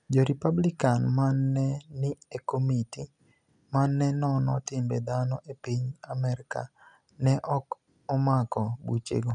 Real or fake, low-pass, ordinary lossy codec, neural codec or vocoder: real; 10.8 kHz; none; none